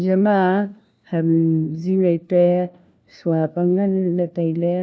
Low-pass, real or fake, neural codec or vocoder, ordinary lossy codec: none; fake; codec, 16 kHz, 1 kbps, FunCodec, trained on LibriTTS, 50 frames a second; none